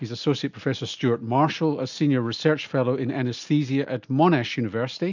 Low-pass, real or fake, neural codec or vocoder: 7.2 kHz; real; none